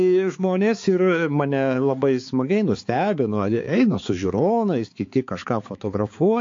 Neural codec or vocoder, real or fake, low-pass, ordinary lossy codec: codec, 16 kHz, 4 kbps, X-Codec, HuBERT features, trained on balanced general audio; fake; 7.2 kHz; AAC, 48 kbps